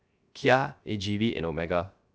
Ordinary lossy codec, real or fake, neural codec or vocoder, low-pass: none; fake; codec, 16 kHz, 0.3 kbps, FocalCodec; none